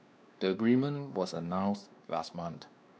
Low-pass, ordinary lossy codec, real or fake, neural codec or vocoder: none; none; fake; codec, 16 kHz, 2 kbps, X-Codec, WavLM features, trained on Multilingual LibriSpeech